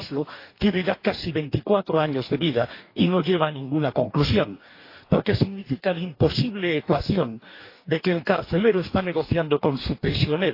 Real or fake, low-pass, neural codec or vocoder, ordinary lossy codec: fake; 5.4 kHz; codec, 44.1 kHz, 2.6 kbps, DAC; AAC, 32 kbps